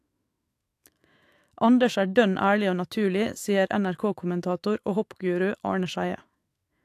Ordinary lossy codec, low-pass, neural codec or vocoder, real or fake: AAC, 64 kbps; 14.4 kHz; autoencoder, 48 kHz, 128 numbers a frame, DAC-VAE, trained on Japanese speech; fake